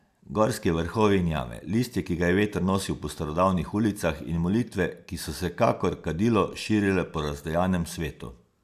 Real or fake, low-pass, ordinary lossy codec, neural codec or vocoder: real; 14.4 kHz; AAC, 96 kbps; none